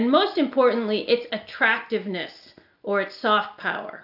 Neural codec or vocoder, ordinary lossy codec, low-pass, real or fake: none; AAC, 48 kbps; 5.4 kHz; real